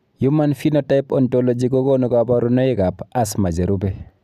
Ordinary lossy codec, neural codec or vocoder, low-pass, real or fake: none; none; 14.4 kHz; real